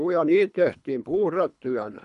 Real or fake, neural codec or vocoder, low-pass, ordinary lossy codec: fake; codec, 24 kHz, 3 kbps, HILCodec; 10.8 kHz; none